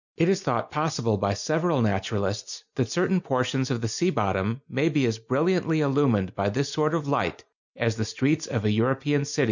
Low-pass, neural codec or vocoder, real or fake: 7.2 kHz; none; real